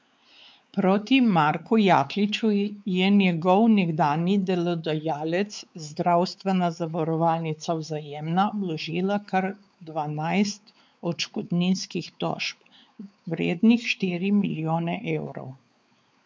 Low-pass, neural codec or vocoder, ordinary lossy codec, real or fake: none; codec, 16 kHz, 4 kbps, X-Codec, WavLM features, trained on Multilingual LibriSpeech; none; fake